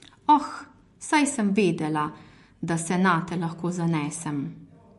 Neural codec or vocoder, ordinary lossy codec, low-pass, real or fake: none; MP3, 48 kbps; 14.4 kHz; real